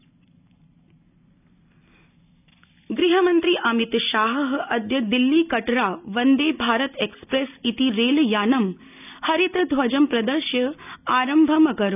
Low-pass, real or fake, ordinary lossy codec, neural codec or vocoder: 3.6 kHz; real; none; none